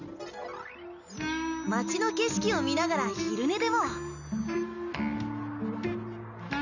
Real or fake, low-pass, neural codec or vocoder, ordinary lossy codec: real; 7.2 kHz; none; none